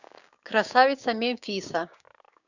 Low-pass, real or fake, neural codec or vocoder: 7.2 kHz; fake; codec, 16 kHz, 6 kbps, DAC